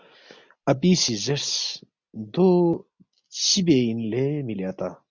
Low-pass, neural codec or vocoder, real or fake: 7.2 kHz; none; real